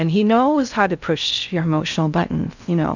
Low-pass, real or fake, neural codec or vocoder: 7.2 kHz; fake; codec, 16 kHz in and 24 kHz out, 0.6 kbps, FocalCodec, streaming, 2048 codes